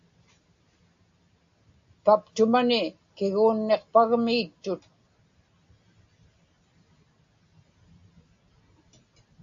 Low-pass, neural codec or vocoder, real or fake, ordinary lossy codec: 7.2 kHz; none; real; AAC, 64 kbps